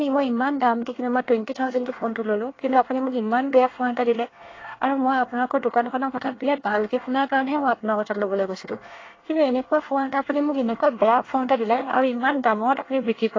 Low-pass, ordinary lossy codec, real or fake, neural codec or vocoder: 7.2 kHz; AAC, 32 kbps; fake; codec, 24 kHz, 1 kbps, SNAC